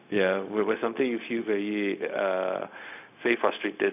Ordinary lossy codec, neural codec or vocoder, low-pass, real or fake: none; codec, 16 kHz, 0.4 kbps, LongCat-Audio-Codec; 3.6 kHz; fake